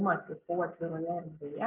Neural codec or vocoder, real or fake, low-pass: none; real; 3.6 kHz